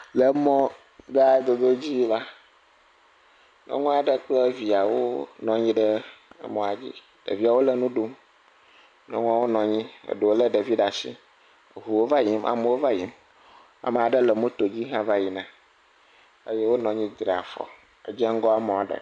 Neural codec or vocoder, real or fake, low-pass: none; real; 9.9 kHz